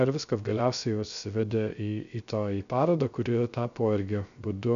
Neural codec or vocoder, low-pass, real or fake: codec, 16 kHz, 0.3 kbps, FocalCodec; 7.2 kHz; fake